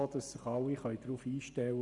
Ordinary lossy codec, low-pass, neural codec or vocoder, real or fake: none; 10.8 kHz; vocoder, 44.1 kHz, 128 mel bands every 512 samples, BigVGAN v2; fake